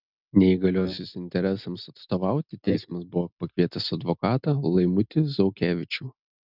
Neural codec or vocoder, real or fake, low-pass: none; real; 5.4 kHz